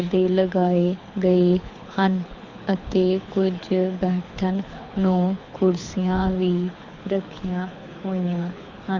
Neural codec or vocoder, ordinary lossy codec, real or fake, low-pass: codec, 24 kHz, 6 kbps, HILCodec; Opus, 64 kbps; fake; 7.2 kHz